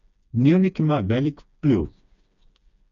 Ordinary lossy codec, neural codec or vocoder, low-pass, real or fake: none; codec, 16 kHz, 2 kbps, FreqCodec, smaller model; 7.2 kHz; fake